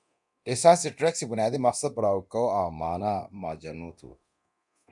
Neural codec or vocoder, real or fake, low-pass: codec, 24 kHz, 0.9 kbps, DualCodec; fake; 10.8 kHz